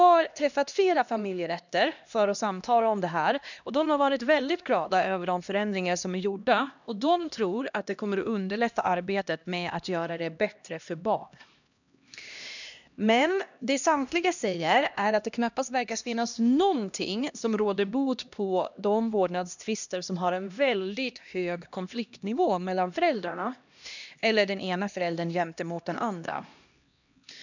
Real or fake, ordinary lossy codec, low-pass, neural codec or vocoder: fake; none; 7.2 kHz; codec, 16 kHz, 1 kbps, X-Codec, HuBERT features, trained on LibriSpeech